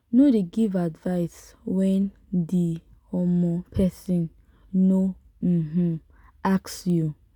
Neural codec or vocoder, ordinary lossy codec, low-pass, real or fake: none; none; none; real